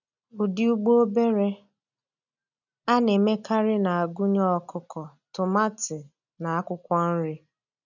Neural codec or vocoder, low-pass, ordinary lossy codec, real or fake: none; 7.2 kHz; none; real